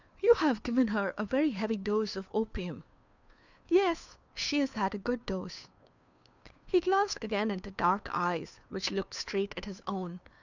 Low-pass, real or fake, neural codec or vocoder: 7.2 kHz; fake; codec, 16 kHz, 2 kbps, FunCodec, trained on Chinese and English, 25 frames a second